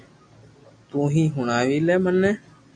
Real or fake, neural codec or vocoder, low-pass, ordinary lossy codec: real; none; 9.9 kHz; AAC, 64 kbps